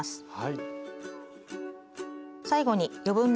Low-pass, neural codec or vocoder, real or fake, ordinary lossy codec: none; none; real; none